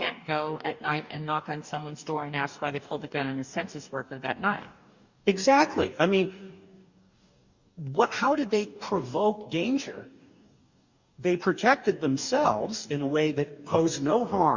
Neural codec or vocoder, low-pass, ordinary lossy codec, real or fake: codec, 44.1 kHz, 2.6 kbps, DAC; 7.2 kHz; Opus, 64 kbps; fake